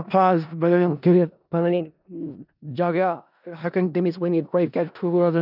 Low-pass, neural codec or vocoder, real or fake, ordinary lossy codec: 5.4 kHz; codec, 16 kHz in and 24 kHz out, 0.4 kbps, LongCat-Audio-Codec, four codebook decoder; fake; none